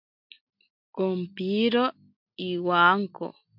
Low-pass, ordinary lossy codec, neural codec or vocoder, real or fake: 5.4 kHz; MP3, 48 kbps; none; real